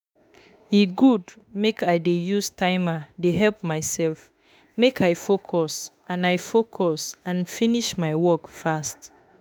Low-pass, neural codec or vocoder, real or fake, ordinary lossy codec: none; autoencoder, 48 kHz, 32 numbers a frame, DAC-VAE, trained on Japanese speech; fake; none